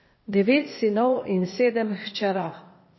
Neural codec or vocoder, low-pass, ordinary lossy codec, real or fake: codec, 16 kHz, 0.8 kbps, ZipCodec; 7.2 kHz; MP3, 24 kbps; fake